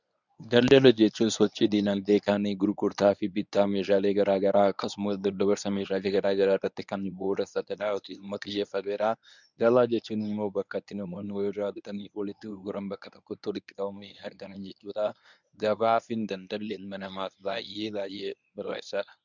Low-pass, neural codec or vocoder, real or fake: 7.2 kHz; codec, 24 kHz, 0.9 kbps, WavTokenizer, medium speech release version 1; fake